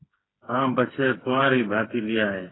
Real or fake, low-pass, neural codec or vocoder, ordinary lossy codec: fake; 7.2 kHz; codec, 16 kHz, 4 kbps, FreqCodec, smaller model; AAC, 16 kbps